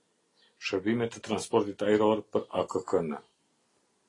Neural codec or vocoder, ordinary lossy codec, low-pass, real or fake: none; AAC, 32 kbps; 10.8 kHz; real